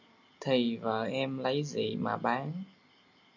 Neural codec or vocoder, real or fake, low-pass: none; real; 7.2 kHz